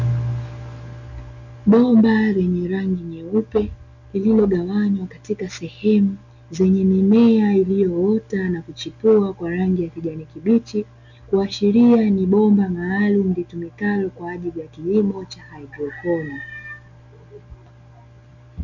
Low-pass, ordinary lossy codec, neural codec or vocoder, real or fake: 7.2 kHz; MP3, 64 kbps; none; real